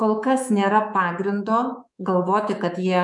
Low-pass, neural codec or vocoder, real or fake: 10.8 kHz; codec, 24 kHz, 3.1 kbps, DualCodec; fake